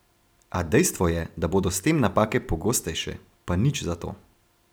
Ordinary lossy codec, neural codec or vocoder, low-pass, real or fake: none; vocoder, 44.1 kHz, 128 mel bands every 256 samples, BigVGAN v2; none; fake